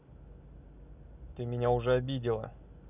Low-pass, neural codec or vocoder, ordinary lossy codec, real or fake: 3.6 kHz; none; none; real